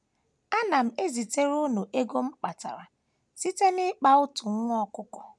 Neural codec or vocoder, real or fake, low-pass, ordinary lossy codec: none; real; none; none